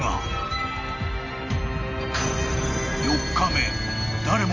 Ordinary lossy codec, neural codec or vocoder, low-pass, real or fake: none; none; 7.2 kHz; real